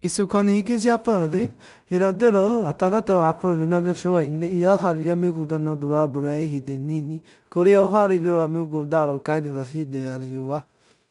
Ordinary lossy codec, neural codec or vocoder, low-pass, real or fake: none; codec, 16 kHz in and 24 kHz out, 0.4 kbps, LongCat-Audio-Codec, two codebook decoder; 10.8 kHz; fake